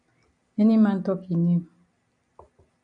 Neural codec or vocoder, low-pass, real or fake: none; 9.9 kHz; real